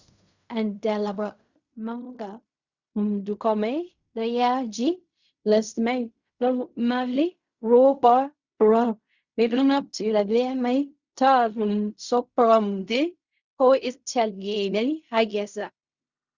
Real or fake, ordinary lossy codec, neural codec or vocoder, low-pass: fake; Opus, 64 kbps; codec, 16 kHz in and 24 kHz out, 0.4 kbps, LongCat-Audio-Codec, fine tuned four codebook decoder; 7.2 kHz